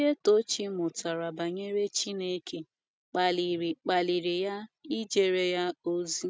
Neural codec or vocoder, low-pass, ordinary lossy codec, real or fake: none; none; none; real